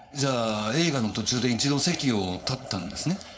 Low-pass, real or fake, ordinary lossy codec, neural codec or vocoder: none; fake; none; codec, 16 kHz, 4.8 kbps, FACodec